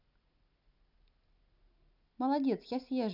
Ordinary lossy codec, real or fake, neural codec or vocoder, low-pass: none; real; none; 5.4 kHz